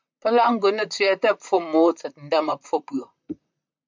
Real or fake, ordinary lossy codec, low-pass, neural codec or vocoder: fake; MP3, 64 kbps; 7.2 kHz; vocoder, 44.1 kHz, 128 mel bands, Pupu-Vocoder